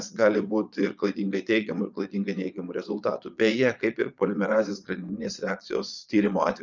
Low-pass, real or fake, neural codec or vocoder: 7.2 kHz; fake; vocoder, 22.05 kHz, 80 mel bands, Vocos